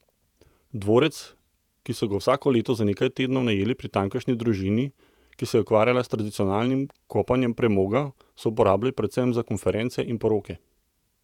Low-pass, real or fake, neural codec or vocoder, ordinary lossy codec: 19.8 kHz; fake; vocoder, 48 kHz, 128 mel bands, Vocos; none